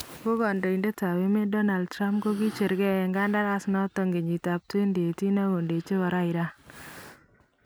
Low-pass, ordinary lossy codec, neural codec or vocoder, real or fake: none; none; none; real